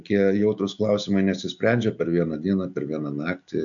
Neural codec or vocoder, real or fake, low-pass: none; real; 7.2 kHz